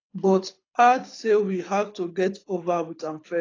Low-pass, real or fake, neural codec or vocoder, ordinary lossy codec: 7.2 kHz; fake; codec, 24 kHz, 6 kbps, HILCodec; AAC, 32 kbps